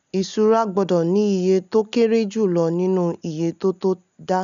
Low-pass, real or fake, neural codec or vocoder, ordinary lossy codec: 7.2 kHz; real; none; MP3, 96 kbps